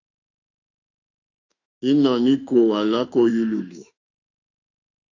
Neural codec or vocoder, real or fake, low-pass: autoencoder, 48 kHz, 32 numbers a frame, DAC-VAE, trained on Japanese speech; fake; 7.2 kHz